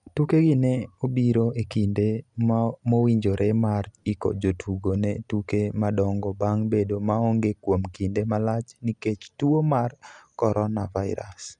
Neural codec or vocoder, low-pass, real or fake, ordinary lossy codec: none; 10.8 kHz; real; none